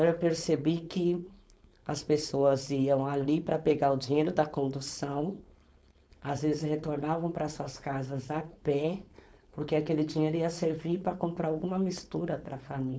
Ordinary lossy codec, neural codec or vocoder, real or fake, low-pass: none; codec, 16 kHz, 4.8 kbps, FACodec; fake; none